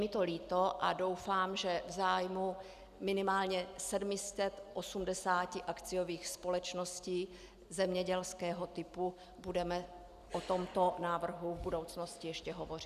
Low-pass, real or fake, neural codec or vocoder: 14.4 kHz; real; none